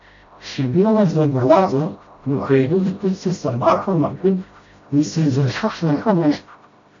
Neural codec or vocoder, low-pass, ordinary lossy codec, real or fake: codec, 16 kHz, 0.5 kbps, FreqCodec, smaller model; 7.2 kHz; AAC, 48 kbps; fake